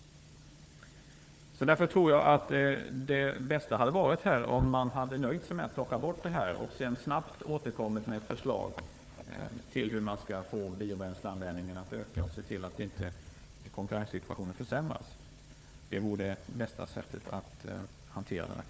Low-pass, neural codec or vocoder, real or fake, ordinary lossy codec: none; codec, 16 kHz, 4 kbps, FunCodec, trained on Chinese and English, 50 frames a second; fake; none